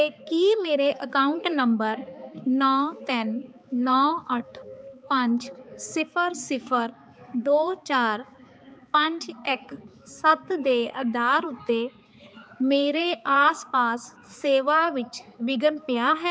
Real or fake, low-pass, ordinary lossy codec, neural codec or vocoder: fake; none; none; codec, 16 kHz, 4 kbps, X-Codec, HuBERT features, trained on balanced general audio